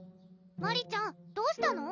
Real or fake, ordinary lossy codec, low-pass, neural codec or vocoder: real; none; 7.2 kHz; none